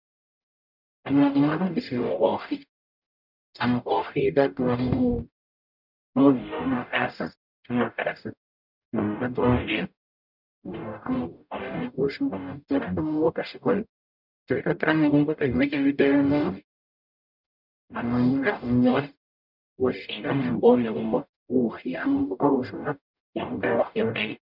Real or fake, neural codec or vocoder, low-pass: fake; codec, 44.1 kHz, 0.9 kbps, DAC; 5.4 kHz